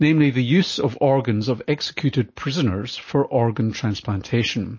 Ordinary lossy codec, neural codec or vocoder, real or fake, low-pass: MP3, 32 kbps; none; real; 7.2 kHz